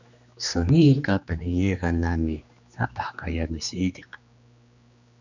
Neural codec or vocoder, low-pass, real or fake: codec, 16 kHz, 2 kbps, X-Codec, HuBERT features, trained on general audio; 7.2 kHz; fake